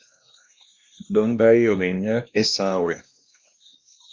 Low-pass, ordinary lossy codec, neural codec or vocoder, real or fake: 7.2 kHz; Opus, 32 kbps; codec, 16 kHz, 1 kbps, X-Codec, WavLM features, trained on Multilingual LibriSpeech; fake